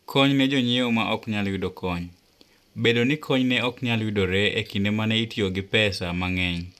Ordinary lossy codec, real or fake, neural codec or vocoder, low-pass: none; real; none; 14.4 kHz